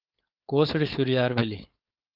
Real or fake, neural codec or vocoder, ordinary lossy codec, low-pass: fake; codec, 16 kHz, 4.8 kbps, FACodec; Opus, 32 kbps; 5.4 kHz